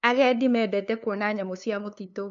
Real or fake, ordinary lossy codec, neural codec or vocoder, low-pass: fake; none; codec, 16 kHz, 2 kbps, FunCodec, trained on LibriTTS, 25 frames a second; 7.2 kHz